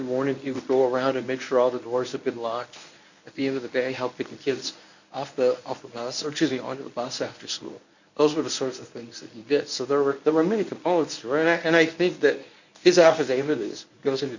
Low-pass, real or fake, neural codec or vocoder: 7.2 kHz; fake; codec, 24 kHz, 0.9 kbps, WavTokenizer, medium speech release version 1